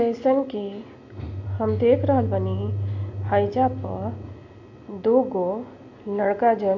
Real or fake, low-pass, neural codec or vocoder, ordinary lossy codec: real; 7.2 kHz; none; AAC, 48 kbps